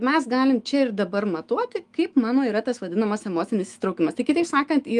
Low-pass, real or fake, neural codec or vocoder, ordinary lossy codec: 10.8 kHz; fake; autoencoder, 48 kHz, 128 numbers a frame, DAC-VAE, trained on Japanese speech; Opus, 32 kbps